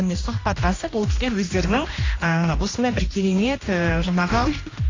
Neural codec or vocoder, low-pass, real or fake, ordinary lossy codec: codec, 16 kHz, 1 kbps, X-Codec, HuBERT features, trained on general audio; 7.2 kHz; fake; AAC, 32 kbps